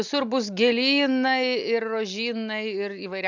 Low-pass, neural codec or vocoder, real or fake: 7.2 kHz; none; real